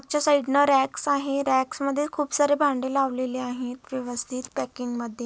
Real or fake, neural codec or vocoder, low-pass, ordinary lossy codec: real; none; none; none